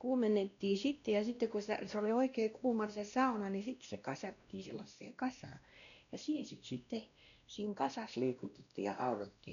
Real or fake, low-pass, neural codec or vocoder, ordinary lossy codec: fake; 7.2 kHz; codec, 16 kHz, 1 kbps, X-Codec, WavLM features, trained on Multilingual LibriSpeech; none